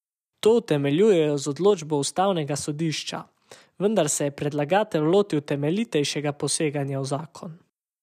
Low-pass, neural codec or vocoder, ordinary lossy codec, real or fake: 14.4 kHz; none; none; real